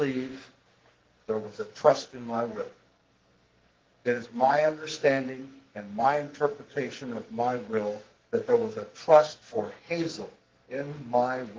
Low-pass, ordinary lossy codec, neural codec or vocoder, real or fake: 7.2 kHz; Opus, 16 kbps; codec, 44.1 kHz, 2.6 kbps, SNAC; fake